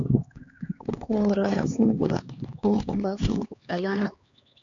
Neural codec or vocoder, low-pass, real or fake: codec, 16 kHz, 2 kbps, X-Codec, HuBERT features, trained on LibriSpeech; 7.2 kHz; fake